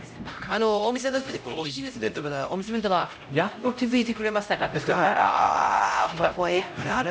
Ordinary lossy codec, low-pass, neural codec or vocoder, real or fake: none; none; codec, 16 kHz, 0.5 kbps, X-Codec, HuBERT features, trained on LibriSpeech; fake